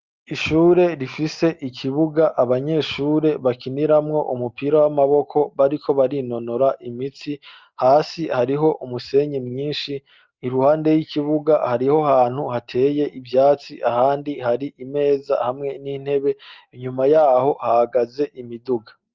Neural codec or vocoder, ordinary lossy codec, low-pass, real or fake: none; Opus, 24 kbps; 7.2 kHz; real